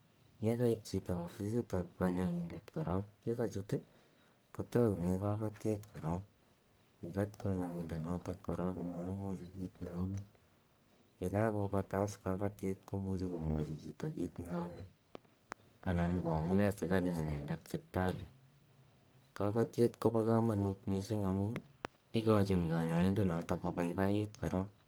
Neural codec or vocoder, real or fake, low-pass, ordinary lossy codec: codec, 44.1 kHz, 1.7 kbps, Pupu-Codec; fake; none; none